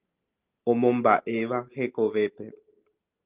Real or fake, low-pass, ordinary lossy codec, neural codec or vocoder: real; 3.6 kHz; Opus, 32 kbps; none